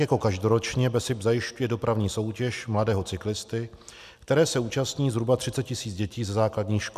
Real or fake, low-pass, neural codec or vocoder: real; 14.4 kHz; none